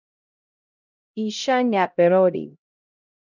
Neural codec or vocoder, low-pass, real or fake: codec, 16 kHz, 0.5 kbps, X-Codec, HuBERT features, trained on LibriSpeech; 7.2 kHz; fake